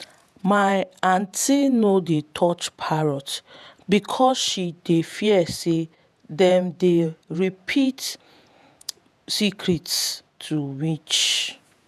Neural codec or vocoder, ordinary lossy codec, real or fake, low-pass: vocoder, 48 kHz, 128 mel bands, Vocos; none; fake; 14.4 kHz